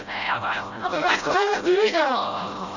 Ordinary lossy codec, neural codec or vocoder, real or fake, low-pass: none; codec, 16 kHz, 0.5 kbps, FreqCodec, smaller model; fake; 7.2 kHz